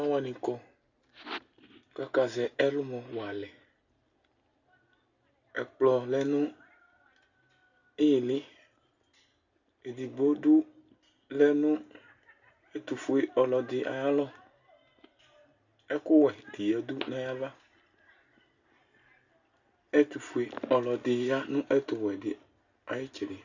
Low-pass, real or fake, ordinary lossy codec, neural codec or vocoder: 7.2 kHz; real; Opus, 64 kbps; none